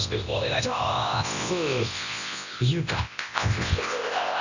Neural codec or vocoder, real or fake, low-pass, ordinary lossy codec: codec, 24 kHz, 0.9 kbps, WavTokenizer, large speech release; fake; 7.2 kHz; none